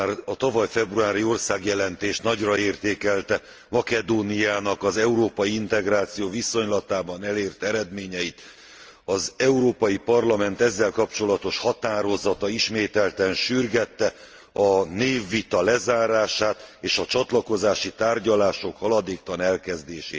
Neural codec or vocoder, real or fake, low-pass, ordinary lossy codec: none; real; 7.2 kHz; Opus, 24 kbps